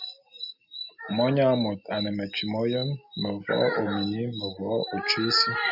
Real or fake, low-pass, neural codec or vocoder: real; 5.4 kHz; none